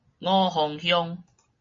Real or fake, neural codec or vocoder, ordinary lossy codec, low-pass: real; none; MP3, 32 kbps; 7.2 kHz